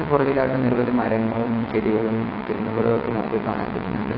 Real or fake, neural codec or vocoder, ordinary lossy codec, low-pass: fake; vocoder, 22.05 kHz, 80 mel bands, WaveNeXt; AAC, 32 kbps; 5.4 kHz